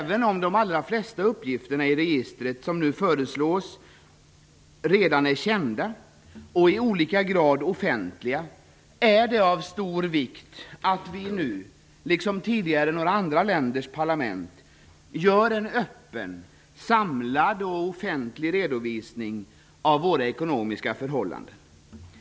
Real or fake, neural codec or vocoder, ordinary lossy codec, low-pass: real; none; none; none